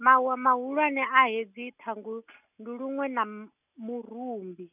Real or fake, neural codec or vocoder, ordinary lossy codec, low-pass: real; none; none; 3.6 kHz